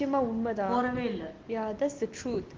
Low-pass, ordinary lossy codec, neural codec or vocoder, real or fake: 7.2 kHz; Opus, 16 kbps; none; real